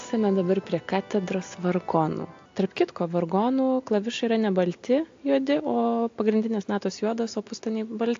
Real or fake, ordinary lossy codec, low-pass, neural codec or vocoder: real; AAC, 48 kbps; 7.2 kHz; none